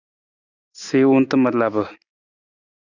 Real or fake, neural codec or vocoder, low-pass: real; none; 7.2 kHz